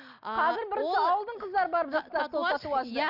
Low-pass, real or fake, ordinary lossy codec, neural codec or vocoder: 5.4 kHz; real; none; none